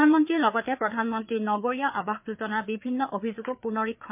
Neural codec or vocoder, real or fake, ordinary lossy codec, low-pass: codec, 16 kHz, 4 kbps, FreqCodec, larger model; fake; MP3, 32 kbps; 3.6 kHz